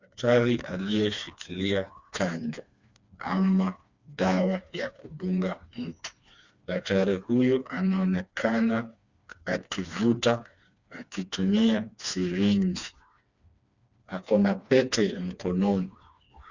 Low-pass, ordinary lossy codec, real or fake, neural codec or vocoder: 7.2 kHz; Opus, 64 kbps; fake; codec, 16 kHz, 2 kbps, FreqCodec, smaller model